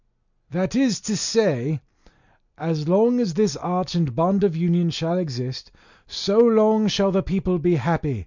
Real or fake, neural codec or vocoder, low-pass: real; none; 7.2 kHz